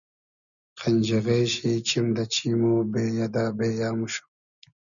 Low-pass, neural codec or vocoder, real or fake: 7.2 kHz; none; real